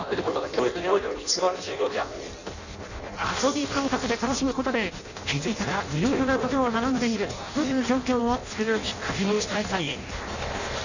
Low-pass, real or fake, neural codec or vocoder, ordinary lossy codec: 7.2 kHz; fake; codec, 16 kHz in and 24 kHz out, 0.6 kbps, FireRedTTS-2 codec; none